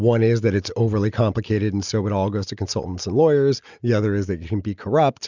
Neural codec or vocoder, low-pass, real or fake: none; 7.2 kHz; real